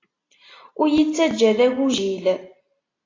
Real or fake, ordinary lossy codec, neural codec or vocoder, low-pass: real; AAC, 32 kbps; none; 7.2 kHz